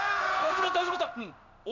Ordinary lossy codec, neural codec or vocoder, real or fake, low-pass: none; codec, 16 kHz in and 24 kHz out, 1 kbps, XY-Tokenizer; fake; 7.2 kHz